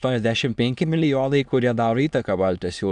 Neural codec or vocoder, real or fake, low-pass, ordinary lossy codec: autoencoder, 22.05 kHz, a latent of 192 numbers a frame, VITS, trained on many speakers; fake; 9.9 kHz; AAC, 96 kbps